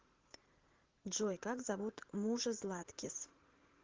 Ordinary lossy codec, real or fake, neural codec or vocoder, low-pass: Opus, 16 kbps; fake; vocoder, 44.1 kHz, 80 mel bands, Vocos; 7.2 kHz